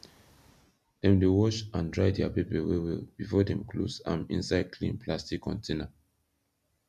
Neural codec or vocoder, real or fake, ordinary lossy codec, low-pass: none; real; none; 14.4 kHz